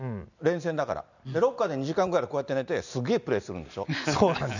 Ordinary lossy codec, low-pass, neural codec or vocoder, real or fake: none; 7.2 kHz; none; real